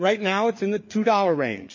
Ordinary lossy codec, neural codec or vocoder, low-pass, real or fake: MP3, 32 kbps; codec, 16 kHz, 4 kbps, FreqCodec, larger model; 7.2 kHz; fake